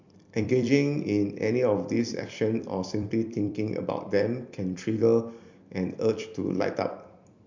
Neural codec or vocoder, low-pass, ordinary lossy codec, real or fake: vocoder, 44.1 kHz, 128 mel bands every 256 samples, BigVGAN v2; 7.2 kHz; MP3, 64 kbps; fake